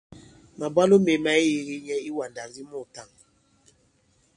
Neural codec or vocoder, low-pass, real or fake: none; 9.9 kHz; real